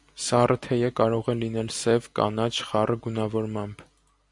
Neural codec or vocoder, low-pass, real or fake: none; 10.8 kHz; real